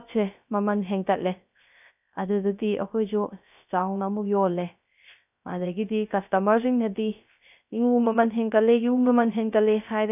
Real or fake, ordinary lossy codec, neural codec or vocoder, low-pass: fake; none; codec, 16 kHz, 0.3 kbps, FocalCodec; 3.6 kHz